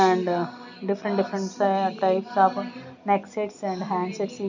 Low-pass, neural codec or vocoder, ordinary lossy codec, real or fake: 7.2 kHz; none; none; real